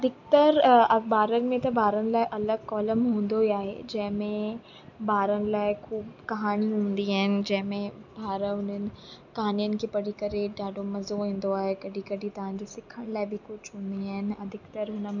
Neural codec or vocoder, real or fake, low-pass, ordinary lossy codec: none; real; 7.2 kHz; none